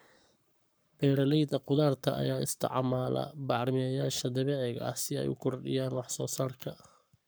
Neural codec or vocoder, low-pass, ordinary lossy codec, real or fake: codec, 44.1 kHz, 7.8 kbps, Pupu-Codec; none; none; fake